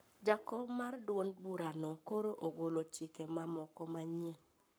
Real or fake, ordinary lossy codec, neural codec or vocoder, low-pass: fake; none; codec, 44.1 kHz, 7.8 kbps, Pupu-Codec; none